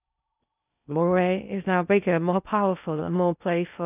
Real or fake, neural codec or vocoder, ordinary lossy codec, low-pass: fake; codec, 16 kHz in and 24 kHz out, 0.6 kbps, FocalCodec, streaming, 4096 codes; none; 3.6 kHz